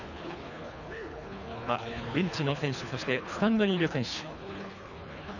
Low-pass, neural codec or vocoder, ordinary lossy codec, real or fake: 7.2 kHz; codec, 24 kHz, 3 kbps, HILCodec; none; fake